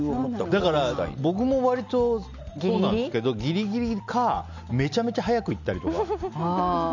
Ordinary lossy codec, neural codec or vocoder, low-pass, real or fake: none; none; 7.2 kHz; real